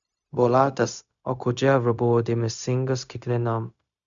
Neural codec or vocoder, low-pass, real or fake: codec, 16 kHz, 0.4 kbps, LongCat-Audio-Codec; 7.2 kHz; fake